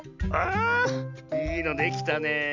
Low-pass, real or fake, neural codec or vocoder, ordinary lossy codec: 7.2 kHz; real; none; MP3, 64 kbps